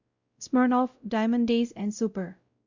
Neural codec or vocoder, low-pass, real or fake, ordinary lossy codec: codec, 16 kHz, 0.5 kbps, X-Codec, WavLM features, trained on Multilingual LibriSpeech; 7.2 kHz; fake; Opus, 64 kbps